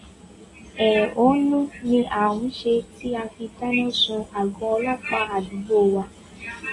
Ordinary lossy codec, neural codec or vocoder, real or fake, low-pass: AAC, 32 kbps; none; real; 10.8 kHz